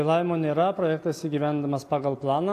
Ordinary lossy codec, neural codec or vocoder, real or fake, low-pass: AAC, 64 kbps; none; real; 14.4 kHz